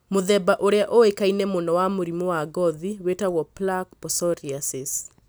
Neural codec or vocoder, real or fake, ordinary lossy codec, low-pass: none; real; none; none